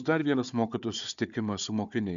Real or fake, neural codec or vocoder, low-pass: fake; codec, 16 kHz, 4 kbps, FunCodec, trained on Chinese and English, 50 frames a second; 7.2 kHz